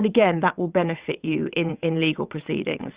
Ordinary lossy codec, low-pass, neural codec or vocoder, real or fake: Opus, 64 kbps; 3.6 kHz; vocoder, 22.05 kHz, 80 mel bands, WaveNeXt; fake